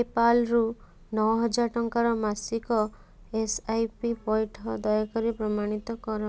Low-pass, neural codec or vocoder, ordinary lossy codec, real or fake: none; none; none; real